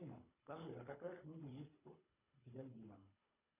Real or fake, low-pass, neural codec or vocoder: fake; 3.6 kHz; codec, 24 kHz, 3 kbps, HILCodec